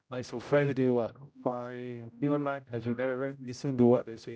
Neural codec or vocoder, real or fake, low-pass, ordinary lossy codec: codec, 16 kHz, 0.5 kbps, X-Codec, HuBERT features, trained on general audio; fake; none; none